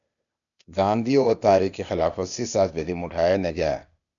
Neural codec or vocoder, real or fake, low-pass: codec, 16 kHz, 0.8 kbps, ZipCodec; fake; 7.2 kHz